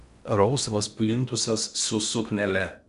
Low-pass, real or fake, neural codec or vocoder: 10.8 kHz; fake; codec, 16 kHz in and 24 kHz out, 0.6 kbps, FocalCodec, streaming, 4096 codes